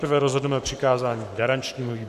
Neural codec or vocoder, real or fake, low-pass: codec, 44.1 kHz, 7.8 kbps, Pupu-Codec; fake; 14.4 kHz